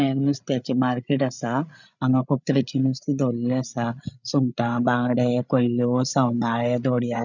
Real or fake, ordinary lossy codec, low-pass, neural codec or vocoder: fake; none; 7.2 kHz; codec, 16 kHz, 8 kbps, FreqCodec, larger model